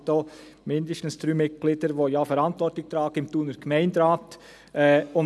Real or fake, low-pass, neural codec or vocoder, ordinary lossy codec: real; none; none; none